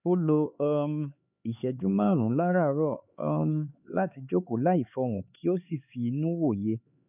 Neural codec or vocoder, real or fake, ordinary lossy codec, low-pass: codec, 16 kHz, 4 kbps, X-Codec, HuBERT features, trained on LibriSpeech; fake; none; 3.6 kHz